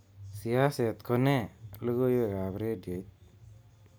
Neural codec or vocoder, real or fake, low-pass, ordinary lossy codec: none; real; none; none